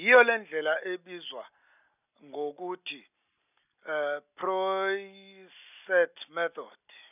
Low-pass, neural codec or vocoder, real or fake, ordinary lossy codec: 3.6 kHz; none; real; none